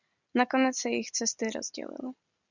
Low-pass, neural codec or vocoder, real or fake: 7.2 kHz; none; real